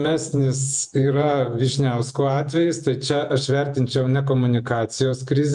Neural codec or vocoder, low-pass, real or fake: vocoder, 48 kHz, 128 mel bands, Vocos; 10.8 kHz; fake